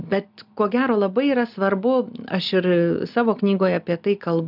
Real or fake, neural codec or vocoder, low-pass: real; none; 5.4 kHz